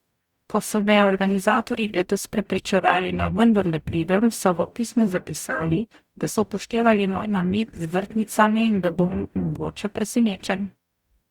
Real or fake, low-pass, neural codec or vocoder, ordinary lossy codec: fake; 19.8 kHz; codec, 44.1 kHz, 0.9 kbps, DAC; Opus, 64 kbps